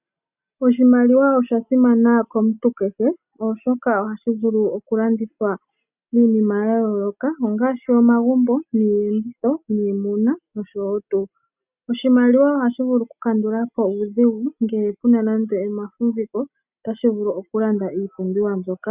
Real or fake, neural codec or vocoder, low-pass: real; none; 3.6 kHz